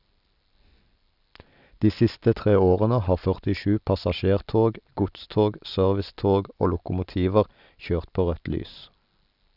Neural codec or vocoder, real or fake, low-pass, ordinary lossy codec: none; real; 5.4 kHz; none